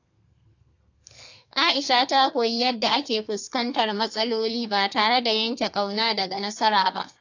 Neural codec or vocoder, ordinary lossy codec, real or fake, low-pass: codec, 32 kHz, 1.9 kbps, SNAC; AAC, 48 kbps; fake; 7.2 kHz